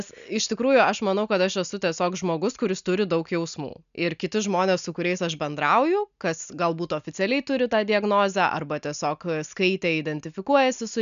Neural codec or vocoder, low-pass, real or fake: none; 7.2 kHz; real